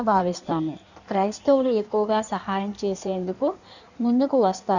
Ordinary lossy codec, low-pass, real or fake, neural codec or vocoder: none; 7.2 kHz; fake; codec, 16 kHz in and 24 kHz out, 1.1 kbps, FireRedTTS-2 codec